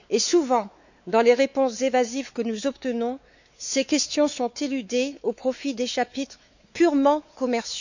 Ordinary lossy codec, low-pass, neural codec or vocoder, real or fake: none; 7.2 kHz; codec, 16 kHz, 4 kbps, X-Codec, WavLM features, trained on Multilingual LibriSpeech; fake